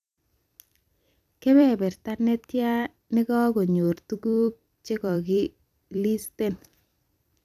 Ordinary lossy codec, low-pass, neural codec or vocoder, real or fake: none; 14.4 kHz; vocoder, 44.1 kHz, 128 mel bands every 256 samples, BigVGAN v2; fake